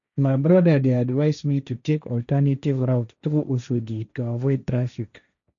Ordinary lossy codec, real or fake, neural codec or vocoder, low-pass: none; fake; codec, 16 kHz, 1.1 kbps, Voila-Tokenizer; 7.2 kHz